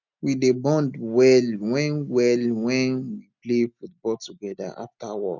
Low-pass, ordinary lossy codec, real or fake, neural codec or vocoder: 7.2 kHz; none; real; none